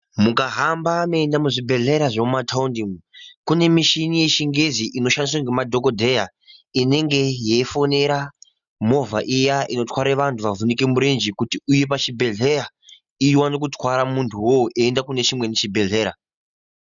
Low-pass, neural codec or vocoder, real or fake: 7.2 kHz; none; real